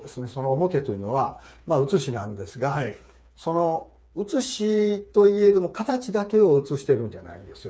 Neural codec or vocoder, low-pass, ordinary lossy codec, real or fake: codec, 16 kHz, 4 kbps, FreqCodec, smaller model; none; none; fake